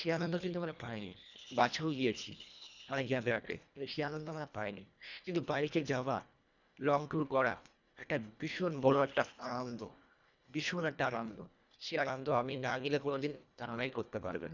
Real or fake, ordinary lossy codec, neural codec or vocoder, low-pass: fake; none; codec, 24 kHz, 1.5 kbps, HILCodec; 7.2 kHz